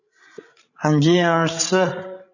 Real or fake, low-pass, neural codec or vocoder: fake; 7.2 kHz; codec, 16 kHz, 16 kbps, FreqCodec, larger model